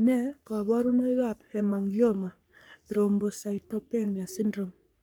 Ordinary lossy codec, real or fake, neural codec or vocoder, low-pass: none; fake; codec, 44.1 kHz, 3.4 kbps, Pupu-Codec; none